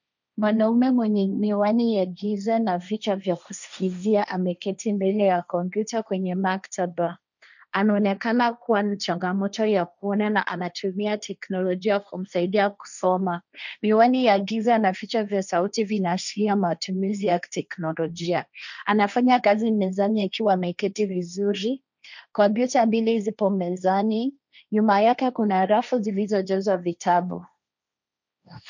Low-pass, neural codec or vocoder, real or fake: 7.2 kHz; codec, 16 kHz, 1.1 kbps, Voila-Tokenizer; fake